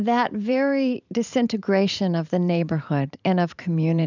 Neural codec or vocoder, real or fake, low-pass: vocoder, 44.1 kHz, 128 mel bands every 512 samples, BigVGAN v2; fake; 7.2 kHz